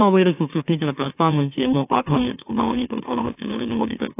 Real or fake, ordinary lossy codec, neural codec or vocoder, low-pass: fake; AAC, 24 kbps; autoencoder, 44.1 kHz, a latent of 192 numbers a frame, MeloTTS; 3.6 kHz